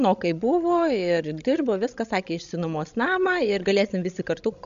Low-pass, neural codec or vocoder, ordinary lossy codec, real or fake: 7.2 kHz; codec, 16 kHz, 16 kbps, FreqCodec, larger model; AAC, 96 kbps; fake